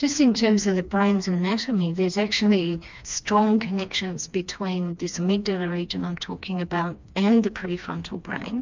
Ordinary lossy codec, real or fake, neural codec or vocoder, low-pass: MP3, 64 kbps; fake; codec, 16 kHz, 2 kbps, FreqCodec, smaller model; 7.2 kHz